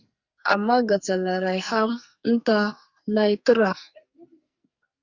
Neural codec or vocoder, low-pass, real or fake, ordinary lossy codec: codec, 44.1 kHz, 2.6 kbps, SNAC; 7.2 kHz; fake; Opus, 64 kbps